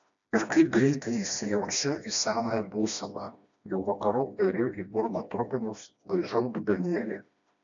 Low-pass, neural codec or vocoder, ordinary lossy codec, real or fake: 7.2 kHz; codec, 16 kHz, 1 kbps, FreqCodec, smaller model; AAC, 64 kbps; fake